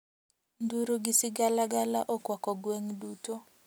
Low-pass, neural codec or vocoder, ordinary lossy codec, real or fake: none; none; none; real